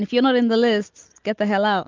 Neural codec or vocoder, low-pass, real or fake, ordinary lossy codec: none; 7.2 kHz; real; Opus, 32 kbps